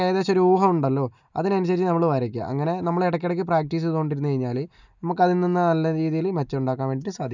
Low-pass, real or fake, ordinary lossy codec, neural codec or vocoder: 7.2 kHz; real; none; none